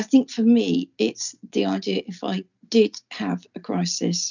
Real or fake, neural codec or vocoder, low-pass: real; none; 7.2 kHz